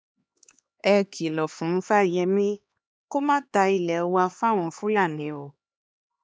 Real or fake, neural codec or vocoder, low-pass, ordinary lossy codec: fake; codec, 16 kHz, 2 kbps, X-Codec, HuBERT features, trained on LibriSpeech; none; none